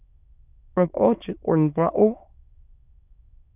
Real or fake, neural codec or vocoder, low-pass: fake; autoencoder, 22.05 kHz, a latent of 192 numbers a frame, VITS, trained on many speakers; 3.6 kHz